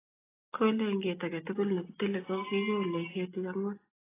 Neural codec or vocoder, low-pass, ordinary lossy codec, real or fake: none; 3.6 kHz; AAC, 16 kbps; real